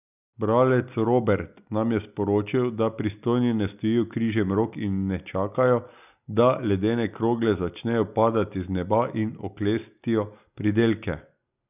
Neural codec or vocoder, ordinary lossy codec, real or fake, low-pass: none; none; real; 3.6 kHz